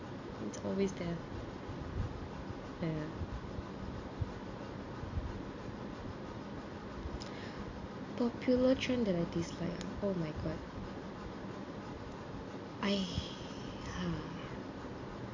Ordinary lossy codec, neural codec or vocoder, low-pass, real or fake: AAC, 48 kbps; none; 7.2 kHz; real